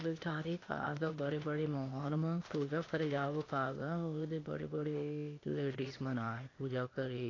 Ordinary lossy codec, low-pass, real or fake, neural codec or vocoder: AAC, 32 kbps; 7.2 kHz; fake; codec, 16 kHz, 0.8 kbps, ZipCodec